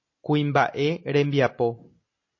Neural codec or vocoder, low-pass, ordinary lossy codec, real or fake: none; 7.2 kHz; MP3, 32 kbps; real